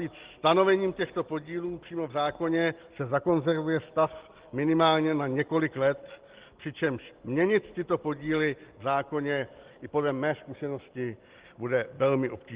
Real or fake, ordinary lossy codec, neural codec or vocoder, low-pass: real; Opus, 16 kbps; none; 3.6 kHz